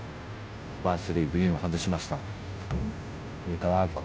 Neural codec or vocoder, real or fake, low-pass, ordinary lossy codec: codec, 16 kHz, 0.5 kbps, FunCodec, trained on Chinese and English, 25 frames a second; fake; none; none